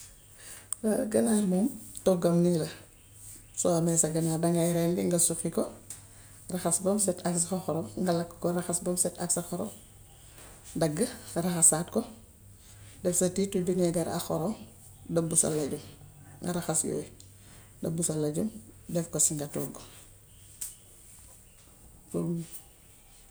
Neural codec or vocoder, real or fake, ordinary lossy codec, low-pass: none; real; none; none